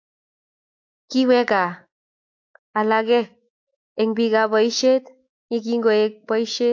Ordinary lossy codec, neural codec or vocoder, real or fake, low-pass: AAC, 48 kbps; autoencoder, 48 kHz, 128 numbers a frame, DAC-VAE, trained on Japanese speech; fake; 7.2 kHz